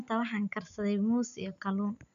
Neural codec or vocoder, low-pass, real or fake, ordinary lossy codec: none; 7.2 kHz; real; none